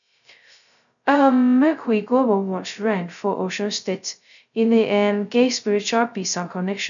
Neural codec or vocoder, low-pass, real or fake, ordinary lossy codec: codec, 16 kHz, 0.2 kbps, FocalCodec; 7.2 kHz; fake; none